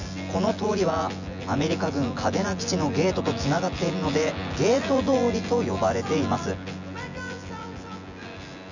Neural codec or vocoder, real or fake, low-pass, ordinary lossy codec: vocoder, 24 kHz, 100 mel bands, Vocos; fake; 7.2 kHz; none